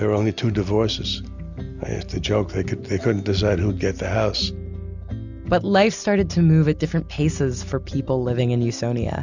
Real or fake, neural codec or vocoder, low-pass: real; none; 7.2 kHz